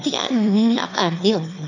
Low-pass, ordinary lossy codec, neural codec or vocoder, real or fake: 7.2 kHz; none; autoencoder, 22.05 kHz, a latent of 192 numbers a frame, VITS, trained on one speaker; fake